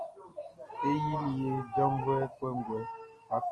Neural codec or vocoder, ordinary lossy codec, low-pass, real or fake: none; Opus, 24 kbps; 10.8 kHz; real